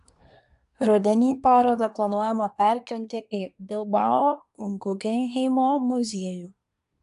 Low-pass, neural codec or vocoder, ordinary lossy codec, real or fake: 10.8 kHz; codec, 24 kHz, 1 kbps, SNAC; AAC, 96 kbps; fake